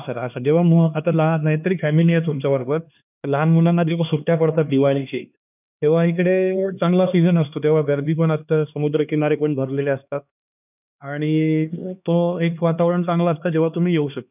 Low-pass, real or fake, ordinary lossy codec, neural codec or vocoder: 3.6 kHz; fake; none; codec, 16 kHz, 2 kbps, X-Codec, HuBERT features, trained on LibriSpeech